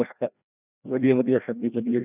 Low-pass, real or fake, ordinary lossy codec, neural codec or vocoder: 3.6 kHz; fake; none; codec, 16 kHz, 1 kbps, FreqCodec, larger model